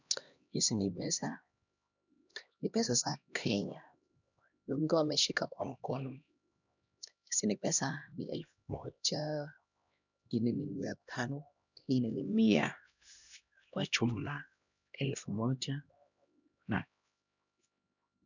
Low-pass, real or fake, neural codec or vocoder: 7.2 kHz; fake; codec, 16 kHz, 1 kbps, X-Codec, HuBERT features, trained on LibriSpeech